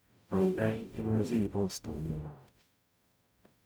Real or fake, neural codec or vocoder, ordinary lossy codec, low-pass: fake; codec, 44.1 kHz, 0.9 kbps, DAC; none; none